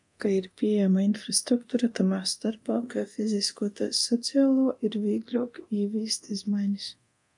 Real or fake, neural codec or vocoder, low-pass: fake; codec, 24 kHz, 0.9 kbps, DualCodec; 10.8 kHz